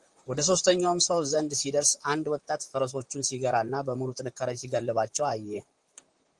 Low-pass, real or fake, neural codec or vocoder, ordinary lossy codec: 10.8 kHz; fake; vocoder, 44.1 kHz, 128 mel bands, Pupu-Vocoder; Opus, 24 kbps